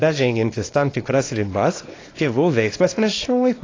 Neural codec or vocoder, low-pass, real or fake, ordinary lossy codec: codec, 24 kHz, 0.9 kbps, WavTokenizer, small release; 7.2 kHz; fake; AAC, 32 kbps